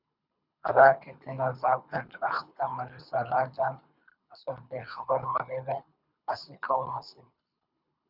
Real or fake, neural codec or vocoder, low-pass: fake; codec, 24 kHz, 3 kbps, HILCodec; 5.4 kHz